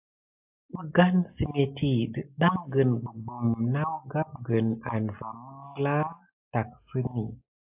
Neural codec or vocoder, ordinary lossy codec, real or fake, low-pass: none; AAC, 32 kbps; real; 3.6 kHz